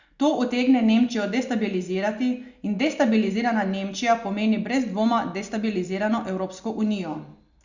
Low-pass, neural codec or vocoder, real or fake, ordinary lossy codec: 7.2 kHz; none; real; Opus, 64 kbps